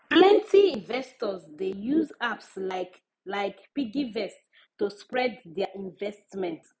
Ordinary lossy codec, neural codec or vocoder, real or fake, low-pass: none; none; real; none